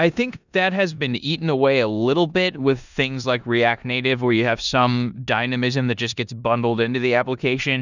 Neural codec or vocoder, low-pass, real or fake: codec, 16 kHz in and 24 kHz out, 0.9 kbps, LongCat-Audio-Codec, four codebook decoder; 7.2 kHz; fake